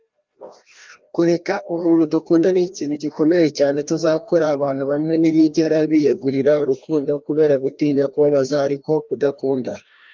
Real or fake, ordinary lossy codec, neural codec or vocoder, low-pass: fake; Opus, 24 kbps; codec, 16 kHz, 1 kbps, FreqCodec, larger model; 7.2 kHz